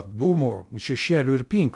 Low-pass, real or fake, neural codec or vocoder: 10.8 kHz; fake; codec, 16 kHz in and 24 kHz out, 0.6 kbps, FocalCodec, streaming, 2048 codes